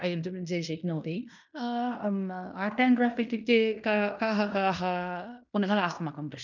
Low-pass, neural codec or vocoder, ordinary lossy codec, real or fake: 7.2 kHz; codec, 16 kHz in and 24 kHz out, 0.9 kbps, LongCat-Audio-Codec, fine tuned four codebook decoder; none; fake